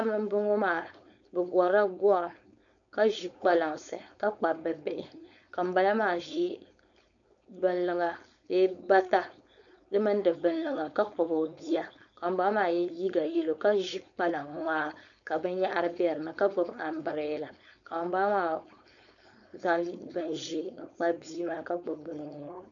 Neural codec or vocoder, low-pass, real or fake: codec, 16 kHz, 4.8 kbps, FACodec; 7.2 kHz; fake